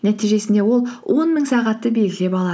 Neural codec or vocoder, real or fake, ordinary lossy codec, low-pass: none; real; none; none